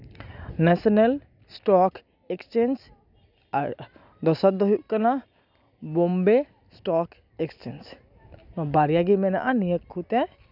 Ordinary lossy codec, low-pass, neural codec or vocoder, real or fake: none; 5.4 kHz; none; real